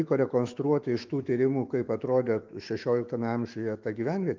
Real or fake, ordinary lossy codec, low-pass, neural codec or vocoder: fake; Opus, 32 kbps; 7.2 kHz; autoencoder, 48 kHz, 128 numbers a frame, DAC-VAE, trained on Japanese speech